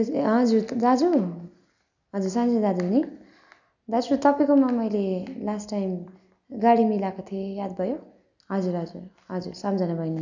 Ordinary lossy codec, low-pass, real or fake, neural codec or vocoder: none; 7.2 kHz; real; none